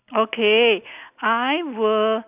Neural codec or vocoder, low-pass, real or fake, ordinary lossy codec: none; 3.6 kHz; real; none